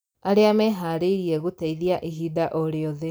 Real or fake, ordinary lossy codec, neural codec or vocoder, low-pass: real; none; none; none